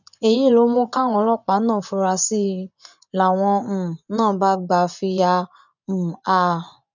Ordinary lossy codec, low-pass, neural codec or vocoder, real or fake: none; 7.2 kHz; vocoder, 24 kHz, 100 mel bands, Vocos; fake